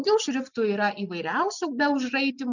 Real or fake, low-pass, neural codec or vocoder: real; 7.2 kHz; none